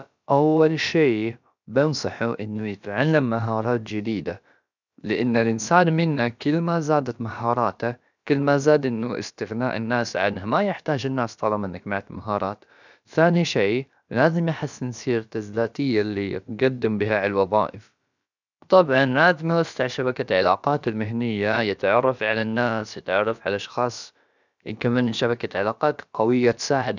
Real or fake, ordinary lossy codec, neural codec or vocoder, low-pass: fake; none; codec, 16 kHz, about 1 kbps, DyCAST, with the encoder's durations; 7.2 kHz